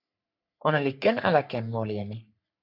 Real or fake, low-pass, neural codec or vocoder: fake; 5.4 kHz; codec, 44.1 kHz, 3.4 kbps, Pupu-Codec